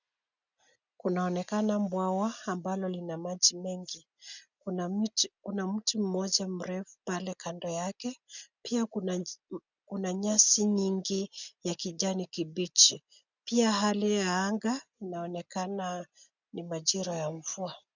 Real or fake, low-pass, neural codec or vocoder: real; 7.2 kHz; none